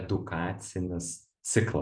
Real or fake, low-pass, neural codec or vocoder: fake; 9.9 kHz; vocoder, 44.1 kHz, 128 mel bands every 256 samples, BigVGAN v2